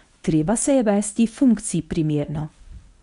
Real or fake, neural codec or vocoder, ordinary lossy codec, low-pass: fake; codec, 24 kHz, 0.9 kbps, WavTokenizer, medium speech release version 1; none; 10.8 kHz